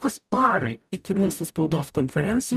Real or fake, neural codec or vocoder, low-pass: fake; codec, 44.1 kHz, 0.9 kbps, DAC; 14.4 kHz